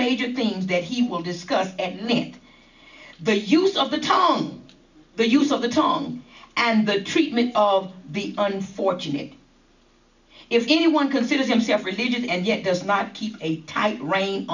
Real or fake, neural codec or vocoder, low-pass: real; none; 7.2 kHz